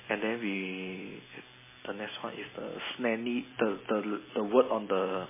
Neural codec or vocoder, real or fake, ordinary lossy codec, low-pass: none; real; MP3, 16 kbps; 3.6 kHz